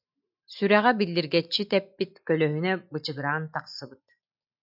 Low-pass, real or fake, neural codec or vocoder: 5.4 kHz; real; none